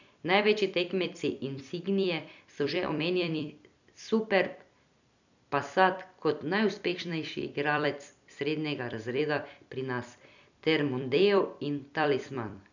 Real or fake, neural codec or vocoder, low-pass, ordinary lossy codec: fake; vocoder, 44.1 kHz, 128 mel bands every 256 samples, BigVGAN v2; 7.2 kHz; none